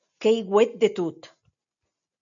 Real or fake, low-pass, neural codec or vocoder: real; 7.2 kHz; none